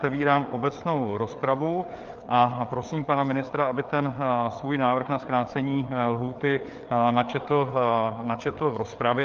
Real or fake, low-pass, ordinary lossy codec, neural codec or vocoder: fake; 7.2 kHz; Opus, 24 kbps; codec, 16 kHz, 4 kbps, FreqCodec, larger model